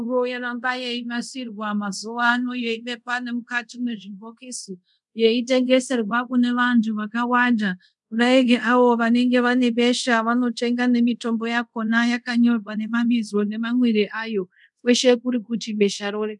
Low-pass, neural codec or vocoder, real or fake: 10.8 kHz; codec, 24 kHz, 0.5 kbps, DualCodec; fake